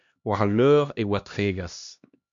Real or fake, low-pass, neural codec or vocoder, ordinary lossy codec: fake; 7.2 kHz; codec, 16 kHz, 1 kbps, X-Codec, HuBERT features, trained on LibriSpeech; MP3, 64 kbps